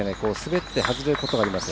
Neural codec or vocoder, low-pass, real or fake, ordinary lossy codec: none; none; real; none